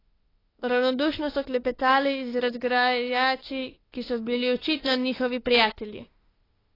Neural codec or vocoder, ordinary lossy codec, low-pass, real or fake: autoencoder, 48 kHz, 32 numbers a frame, DAC-VAE, trained on Japanese speech; AAC, 24 kbps; 5.4 kHz; fake